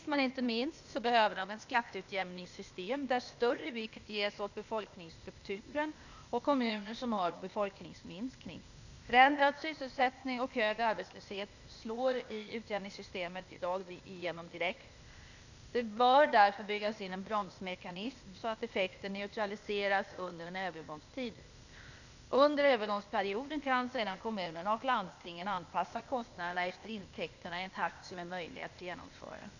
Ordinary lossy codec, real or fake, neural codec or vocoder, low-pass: none; fake; codec, 16 kHz, 0.8 kbps, ZipCodec; 7.2 kHz